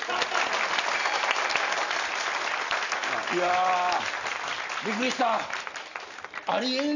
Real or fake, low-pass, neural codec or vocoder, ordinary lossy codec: real; 7.2 kHz; none; none